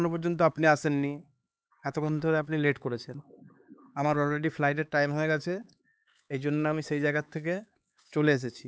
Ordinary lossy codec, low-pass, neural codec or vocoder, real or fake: none; none; codec, 16 kHz, 4 kbps, X-Codec, HuBERT features, trained on LibriSpeech; fake